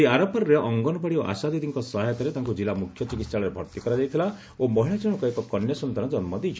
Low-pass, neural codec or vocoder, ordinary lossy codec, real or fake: none; none; none; real